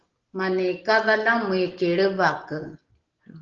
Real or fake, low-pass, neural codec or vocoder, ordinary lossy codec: real; 7.2 kHz; none; Opus, 16 kbps